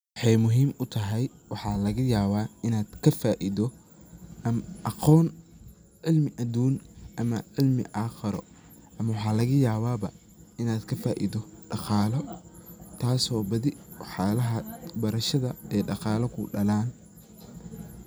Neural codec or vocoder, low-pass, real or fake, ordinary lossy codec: none; none; real; none